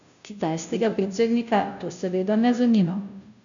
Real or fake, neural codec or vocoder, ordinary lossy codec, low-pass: fake; codec, 16 kHz, 0.5 kbps, FunCodec, trained on Chinese and English, 25 frames a second; none; 7.2 kHz